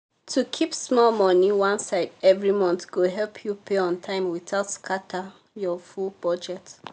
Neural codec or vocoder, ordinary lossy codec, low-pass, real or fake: none; none; none; real